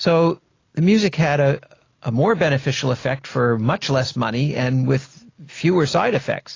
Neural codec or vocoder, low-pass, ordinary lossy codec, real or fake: none; 7.2 kHz; AAC, 32 kbps; real